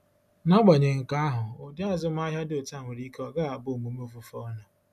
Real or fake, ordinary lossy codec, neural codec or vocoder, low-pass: real; none; none; 14.4 kHz